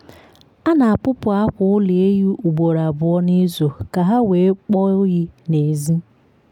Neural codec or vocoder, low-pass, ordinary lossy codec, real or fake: none; 19.8 kHz; none; real